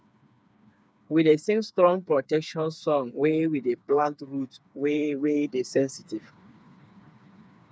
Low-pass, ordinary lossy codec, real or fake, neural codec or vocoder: none; none; fake; codec, 16 kHz, 4 kbps, FreqCodec, smaller model